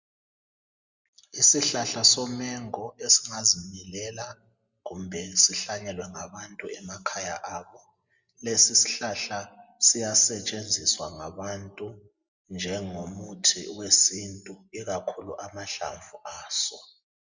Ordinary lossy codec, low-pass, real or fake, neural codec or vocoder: Opus, 64 kbps; 7.2 kHz; real; none